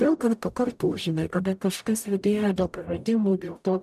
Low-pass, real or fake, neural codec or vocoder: 14.4 kHz; fake; codec, 44.1 kHz, 0.9 kbps, DAC